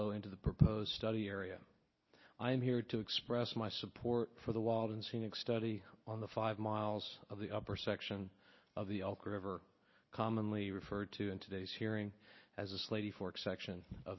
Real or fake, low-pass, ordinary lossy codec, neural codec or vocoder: real; 7.2 kHz; MP3, 24 kbps; none